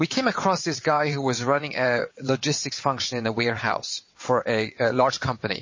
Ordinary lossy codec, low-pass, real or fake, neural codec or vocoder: MP3, 32 kbps; 7.2 kHz; fake; vocoder, 22.05 kHz, 80 mel bands, WaveNeXt